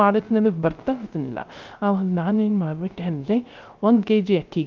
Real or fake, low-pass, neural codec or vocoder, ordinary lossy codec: fake; 7.2 kHz; codec, 16 kHz, 0.3 kbps, FocalCodec; Opus, 32 kbps